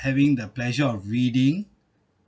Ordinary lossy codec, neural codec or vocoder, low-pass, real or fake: none; none; none; real